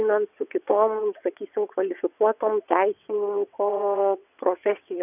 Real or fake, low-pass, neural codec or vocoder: fake; 3.6 kHz; vocoder, 22.05 kHz, 80 mel bands, WaveNeXt